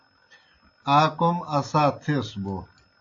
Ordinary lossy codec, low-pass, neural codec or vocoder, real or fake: AAC, 48 kbps; 7.2 kHz; none; real